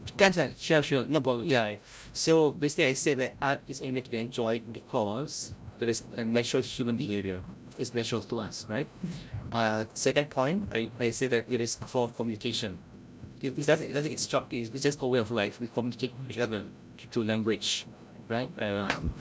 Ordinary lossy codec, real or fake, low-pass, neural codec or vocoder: none; fake; none; codec, 16 kHz, 0.5 kbps, FreqCodec, larger model